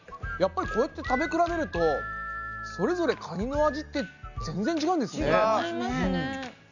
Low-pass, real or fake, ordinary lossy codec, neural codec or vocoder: 7.2 kHz; real; none; none